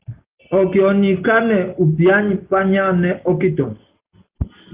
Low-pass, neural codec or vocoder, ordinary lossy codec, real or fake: 3.6 kHz; none; Opus, 32 kbps; real